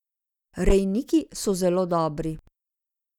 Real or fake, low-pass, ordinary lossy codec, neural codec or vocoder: real; 19.8 kHz; none; none